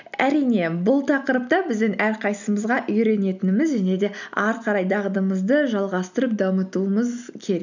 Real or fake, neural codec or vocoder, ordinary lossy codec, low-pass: real; none; none; 7.2 kHz